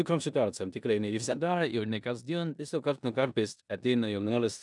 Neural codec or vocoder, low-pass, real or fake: codec, 16 kHz in and 24 kHz out, 0.9 kbps, LongCat-Audio-Codec, four codebook decoder; 10.8 kHz; fake